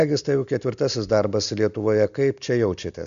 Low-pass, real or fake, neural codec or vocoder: 7.2 kHz; real; none